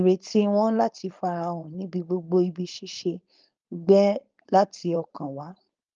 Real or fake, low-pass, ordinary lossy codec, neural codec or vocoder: fake; 7.2 kHz; Opus, 24 kbps; codec, 16 kHz, 4.8 kbps, FACodec